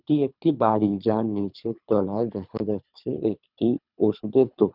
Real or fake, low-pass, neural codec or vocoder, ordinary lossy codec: fake; 5.4 kHz; codec, 24 kHz, 3 kbps, HILCodec; none